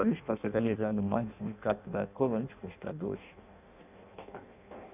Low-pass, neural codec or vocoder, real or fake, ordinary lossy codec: 3.6 kHz; codec, 16 kHz in and 24 kHz out, 0.6 kbps, FireRedTTS-2 codec; fake; none